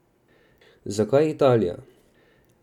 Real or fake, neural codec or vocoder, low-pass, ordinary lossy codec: real; none; 19.8 kHz; none